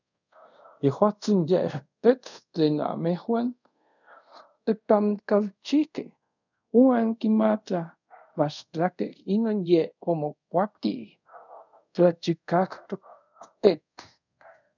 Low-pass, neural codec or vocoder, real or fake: 7.2 kHz; codec, 24 kHz, 0.5 kbps, DualCodec; fake